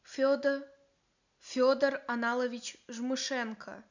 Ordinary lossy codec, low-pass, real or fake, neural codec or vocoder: none; 7.2 kHz; real; none